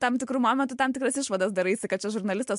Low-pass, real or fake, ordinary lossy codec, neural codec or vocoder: 10.8 kHz; real; MP3, 64 kbps; none